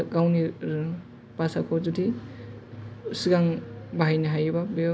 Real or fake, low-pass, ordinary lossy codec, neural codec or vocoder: real; none; none; none